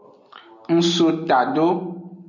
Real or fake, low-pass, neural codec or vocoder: real; 7.2 kHz; none